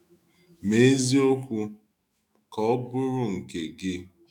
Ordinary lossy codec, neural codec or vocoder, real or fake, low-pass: none; autoencoder, 48 kHz, 128 numbers a frame, DAC-VAE, trained on Japanese speech; fake; 19.8 kHz